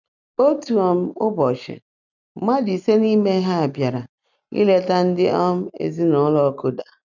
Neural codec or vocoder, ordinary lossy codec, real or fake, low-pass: none; none; real; 7.2 kHz